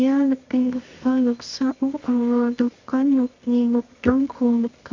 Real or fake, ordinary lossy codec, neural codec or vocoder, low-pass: fake; MP3, 48 kbps; codec, 24 kHz, 0.9 kbps, WavTokenizer, medium music audio release; 7.2 kHz